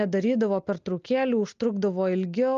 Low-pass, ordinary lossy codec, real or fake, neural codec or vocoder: 7.2 kHz; Opus, 32 kbps; real; none